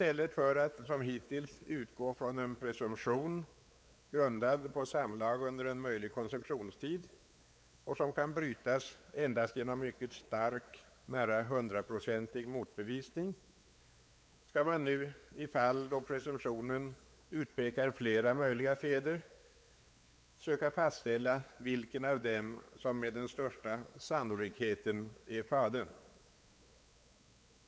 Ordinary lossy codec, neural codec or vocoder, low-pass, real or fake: none; codec, 16 kHz, 4 kbps, X-Codec, WavLM features, trained on Multilingual LibriSpeech; none; fake